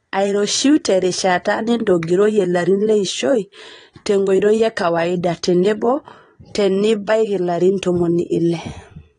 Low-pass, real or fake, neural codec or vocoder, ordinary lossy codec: 9.9 kHz; fake; vocoder, 22.05 kHz, 80 mel bands, Vocos; AAC, 32 kbps